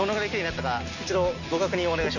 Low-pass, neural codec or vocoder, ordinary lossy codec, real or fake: 7.2 kHz; none; none; real